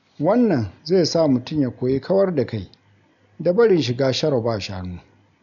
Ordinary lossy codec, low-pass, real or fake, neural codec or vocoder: none; 7.2 kHz; real; none